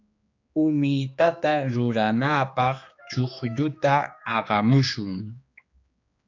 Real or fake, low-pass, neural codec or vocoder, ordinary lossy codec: fake; 7.2 kHz; codec, 16 kHz, 2 kbps, X-Codec, HuBERT features, trained on general audio; AAC, 48 kbps